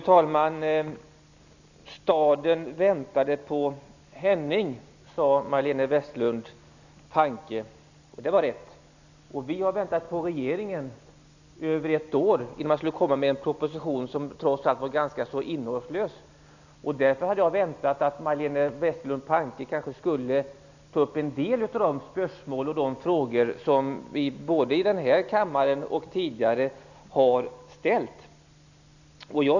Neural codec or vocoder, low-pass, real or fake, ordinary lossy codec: none; 7.2 kHz; real; none